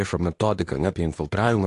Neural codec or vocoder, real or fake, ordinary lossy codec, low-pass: codec, 24 kHz, 0.9 kbps, WavTokenizer, medium speech release version 2; fake; AAC, 48 kbps; 10.8 kHz